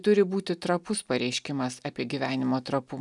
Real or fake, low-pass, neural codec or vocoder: real; 10.8 kHz; none